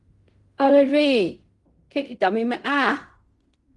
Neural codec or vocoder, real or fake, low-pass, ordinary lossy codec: codec, 16 kHz in and 24 kHz out, 0.4 kbps, LongCat-Audio-Codec, fine tuned four codebook decoder; fake; 10.8 kHz; Opus, 24 kbps